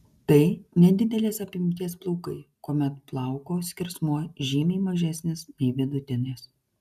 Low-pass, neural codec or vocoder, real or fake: 14.4 kHz; none; real